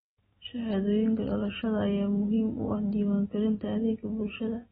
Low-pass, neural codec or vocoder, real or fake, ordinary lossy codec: 14.4 kHz; none; real; AAC, 16 kbps